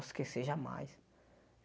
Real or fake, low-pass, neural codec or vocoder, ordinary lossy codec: real; none; none; none